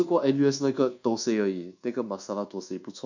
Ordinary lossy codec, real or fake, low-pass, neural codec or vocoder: none; fake; 7.2 kHz; codec, 24 kHz, 1.2 kbps, DualCodec